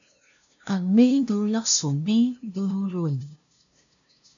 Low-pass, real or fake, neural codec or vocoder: 7.2 kHz; fake; codec, 16 kHz, 0.5 kbps, FunCodec, trained on LibriTTS, 25 frames a second